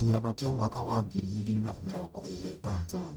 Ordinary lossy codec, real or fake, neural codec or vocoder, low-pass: none; fake; codec, 44.1 kHz, 0.9 kbps, DAC; none